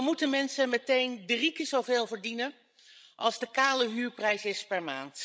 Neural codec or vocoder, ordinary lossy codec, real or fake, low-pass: codec, 16 kHz, 16 kbps, FreqCodec, larger model; none; fake; none